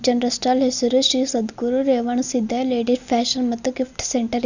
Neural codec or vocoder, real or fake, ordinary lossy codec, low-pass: none; real; none; 7.2 kHz